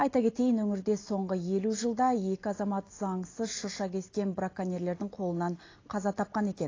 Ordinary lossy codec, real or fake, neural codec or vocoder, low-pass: AAC, 32 kbps; real; none; 7.2 kHz